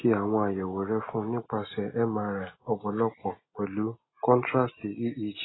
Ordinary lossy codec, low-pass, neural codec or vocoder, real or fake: AAC, 16 kbps; 7.2 kHz; none; real